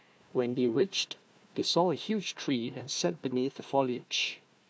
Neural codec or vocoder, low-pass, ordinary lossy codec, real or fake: codec, 16 kHz, 1 kbps, FunCodec, trained on Chinese and English, 50 frames a second; none; none; fake